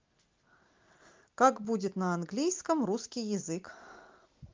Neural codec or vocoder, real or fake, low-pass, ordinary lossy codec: none; real; 7.2 kHz; Opus, 32 kbps